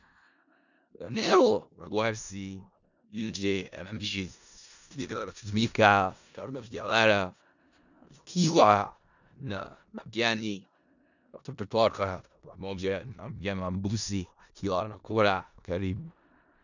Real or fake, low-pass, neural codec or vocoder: fake; 7.2 kHz; codec, 16 kHz in and 24 kHz out, 0.4 kbps, LongCat-Audio-Codec, four codebook decoder